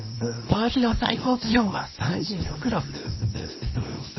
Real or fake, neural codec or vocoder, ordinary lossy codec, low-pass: fake; codec, 24 kHz, 0.9 kbps, WavTokenizer, small release; MP3, 24 kbps; 7.2 kHz